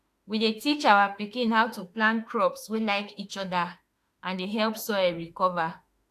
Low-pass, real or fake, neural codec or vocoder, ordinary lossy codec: 14.4 kHz; fake; autoencoder, 48 kHz, 32 numbers a frame, DAC-VAE, trained on Japanese speech; AAC, 64 kbps